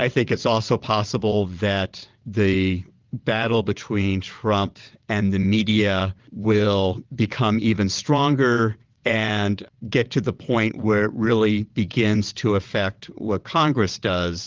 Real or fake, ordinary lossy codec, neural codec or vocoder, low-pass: fake; Opus, 24 kbps; vocoder, 22.05 kHz, 80 mel bands, WaveNeXt; 7.2 kHz